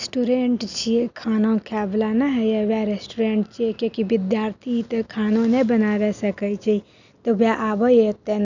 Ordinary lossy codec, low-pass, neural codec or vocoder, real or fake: none; 7.2 kHz; none; real